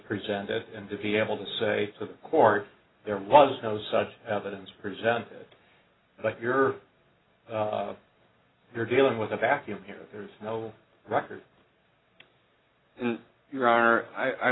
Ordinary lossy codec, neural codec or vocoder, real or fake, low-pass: AAC, 16 kbps; none; real; 7.2 kHz